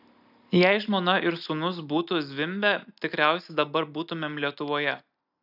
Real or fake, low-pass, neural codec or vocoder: real; 5.4 kHz; none